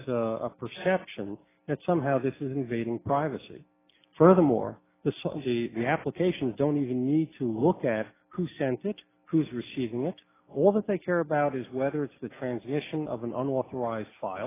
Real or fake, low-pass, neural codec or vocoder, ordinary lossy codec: real; 3.6 kHz; none; AAC, 16 kbps